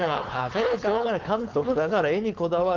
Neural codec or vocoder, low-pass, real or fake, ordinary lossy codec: codec, 16 kHz, 4.8 kbps, FACodec; 7.2 kHz; fake; Opus, 32 kbps